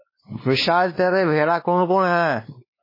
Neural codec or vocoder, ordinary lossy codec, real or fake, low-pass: codec, 16 kHz, 2 kbps, X-Codec, WavLM features, trained on Multilingual LibriSpeech; MP3, 24 kbps; fake; 5.4 kHz